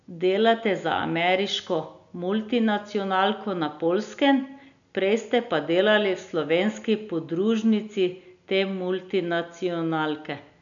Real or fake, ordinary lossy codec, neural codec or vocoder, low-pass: real; none; none; 7.2 kHz